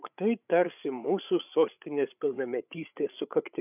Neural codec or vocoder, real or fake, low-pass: codec, 16 kHz, 8 kbps, FreqCodec, larger model; fake; 3.6 kHz